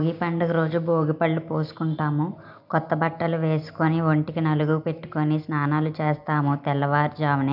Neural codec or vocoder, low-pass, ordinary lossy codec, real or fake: none; 5.4 kHz; none; real